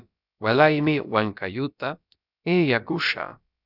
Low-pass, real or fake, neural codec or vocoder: 5.4 kHz; fake; codec, 16 kHz, about 1 kbps, DyCAST, with the encoder's durations